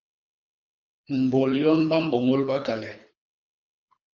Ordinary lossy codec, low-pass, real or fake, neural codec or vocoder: Opus, 64 kbps; 7.2 kHz; fake; codec, 24 kHz, 3 kbps, HILCodec